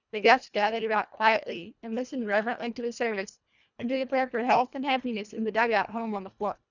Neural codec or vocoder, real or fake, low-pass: codec, 24 kHz, 1.5 kbps, HILCodec; fake; 7.2 kHz